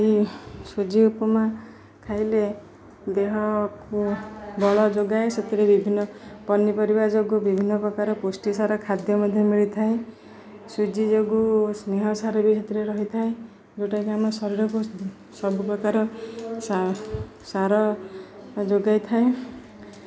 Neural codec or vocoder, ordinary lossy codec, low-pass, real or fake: none; none; none; real